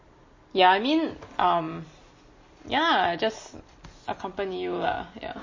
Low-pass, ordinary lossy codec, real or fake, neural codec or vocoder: 7.2 kHz; MP3, 32 kbps; real; none